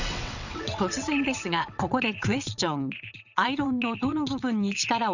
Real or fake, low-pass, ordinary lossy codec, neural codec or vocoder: fake; 7.2 kHz; none; vocoder, 22.05 kHz, 80 mel bands, WaveNeXt